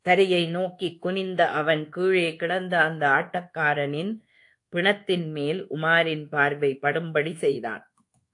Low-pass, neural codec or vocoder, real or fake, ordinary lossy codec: 10.8 kHz; codec, 24 kHz, 1.2 kbps, DualCodec; fake; AAC, 48 kbps